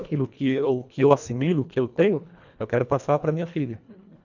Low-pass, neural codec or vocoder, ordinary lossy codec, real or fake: 7.2 kHz; codec, 24 kHz, 1.5 kbps, HILCodec; none; fake